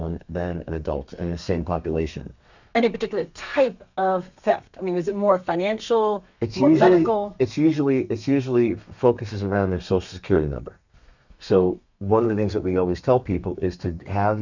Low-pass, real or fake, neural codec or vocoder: 7.2 kHz; fake; codec, 32 kHz, 1.9 kbps, SNAC